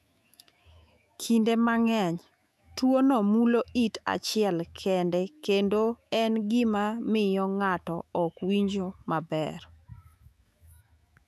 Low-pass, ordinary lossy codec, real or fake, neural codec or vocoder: 14.4 kHz; none; fake; autoencoder, 48 kHz, 128 numbers a frame, DAC-VAE, trained on Japanese speech